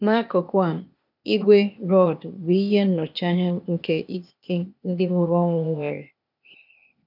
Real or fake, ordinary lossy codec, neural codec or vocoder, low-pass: fake; none; codec, 16 kHz, 0.8 kbps, ZipCodec; 5.4 kHz